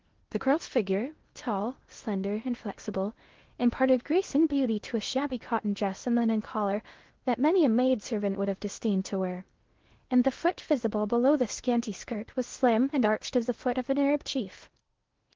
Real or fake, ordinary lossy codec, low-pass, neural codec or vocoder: fake; Opus, 16 kbps; 7.2 kHz; codec, 16 kHz in and 24 kHz out, 0.6 kbps, FocalCodec, streaming, 2048 codes